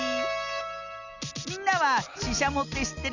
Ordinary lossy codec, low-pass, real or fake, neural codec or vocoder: none; 7.2 kHz; real; none